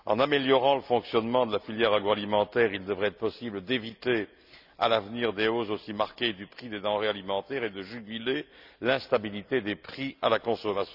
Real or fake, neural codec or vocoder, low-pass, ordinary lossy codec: real; none; 5.4 kHz; none